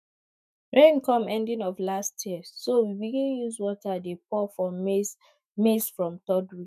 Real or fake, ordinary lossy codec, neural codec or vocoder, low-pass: fake; none; autoencoder, 48 kHz, 128 numbers a frame, DAC-VAE, trained on Japanese speech; 14.4 kHz